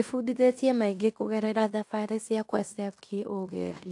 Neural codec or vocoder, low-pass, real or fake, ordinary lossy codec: codec, 16 kHz in and 24 kHz out, 0.9 kbps, LongCat-Audio-Codec, fine tuned four codebook decoder; 10.8 kHz; fake; AAC, 64 kbps